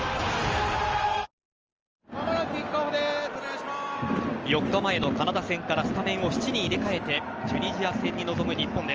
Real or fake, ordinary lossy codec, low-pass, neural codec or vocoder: real; Opus, 24 kbps; 7.2 kHz; none